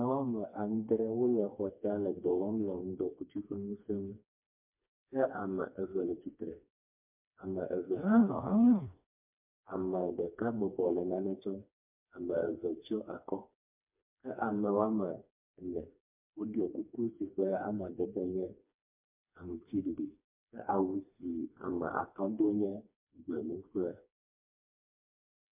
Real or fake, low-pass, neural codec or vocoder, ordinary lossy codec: fake; 3.6 kHz; codec, 16 kHz, 2 kbps, FreqCodec, smaller model; MP3, 32 kbps